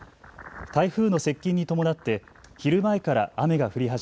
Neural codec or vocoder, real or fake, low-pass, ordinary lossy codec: none; real; none; none